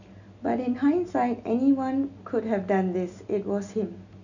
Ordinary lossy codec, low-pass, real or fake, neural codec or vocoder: none; 7.2 kHz; real; none